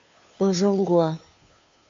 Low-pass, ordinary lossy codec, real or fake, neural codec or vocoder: 7.2 kHz; MP3, 48 kbps; fake; codec, 16 kHz, 2 kbps, FunCodec, trained on Chinese and English, 25 frames a second